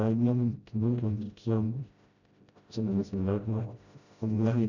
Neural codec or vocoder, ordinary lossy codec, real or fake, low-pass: codec, 16 kHz, 0.5 kbps, FreqCodec, smaller model; none; fake; 7.2 kHz